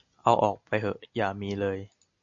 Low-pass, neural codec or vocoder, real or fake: 7.2 kHz; none; real